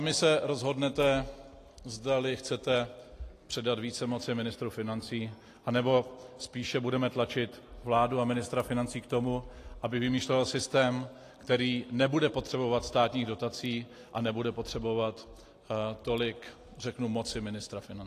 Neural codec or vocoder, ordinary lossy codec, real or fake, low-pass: none; AAC, 48 kbps; real; 14.4 kHz